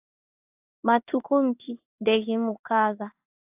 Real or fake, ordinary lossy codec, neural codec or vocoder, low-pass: fake; AAC, 32 kbps; codec, 16 kHz in and 24 kHz out, 1 kbps, XY-Tokenizer; 3.6 kHz